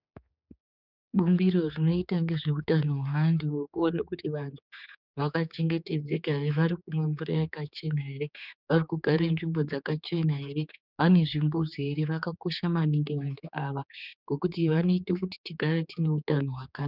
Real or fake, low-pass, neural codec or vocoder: fake; 5.4 kHz; codec, 16 kHz, 4 kbps, X-Codec, HuBERT features, trained on general audio